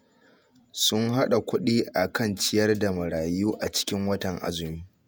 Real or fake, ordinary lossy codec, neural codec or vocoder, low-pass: fake; none; vocoder, 48 kHz, 128 mel bands, Vocos; none